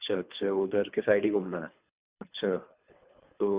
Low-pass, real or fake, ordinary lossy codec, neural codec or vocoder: 3.6 kHz; fake; Opus, 64 kbps; codec, 24 kHz, 3 kbps, HILCodec